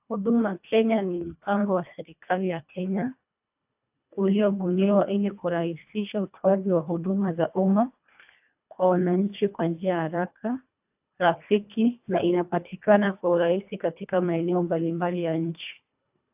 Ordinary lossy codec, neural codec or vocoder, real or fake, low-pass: AAC, 32 kbps; codec, 24 kHz, 1.5 kbps, HILCodec; fake; 3.6 kHz